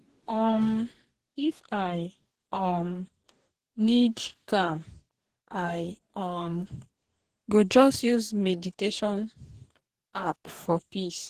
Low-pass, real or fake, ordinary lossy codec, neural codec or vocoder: 14.4 kHz; fake; Opus, 16 kbps; codec, 44.1 kHz, 2.6 kbps, DAC